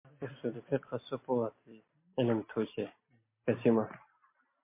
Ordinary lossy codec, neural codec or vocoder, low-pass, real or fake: MP3, 24 kbps; none; 3.6 kHz; real